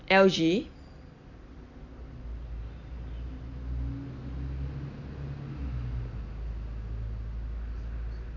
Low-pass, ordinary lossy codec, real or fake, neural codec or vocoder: 7.2 kHz; none; real; none